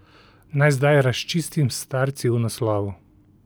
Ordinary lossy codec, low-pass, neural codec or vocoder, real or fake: none; none; none; real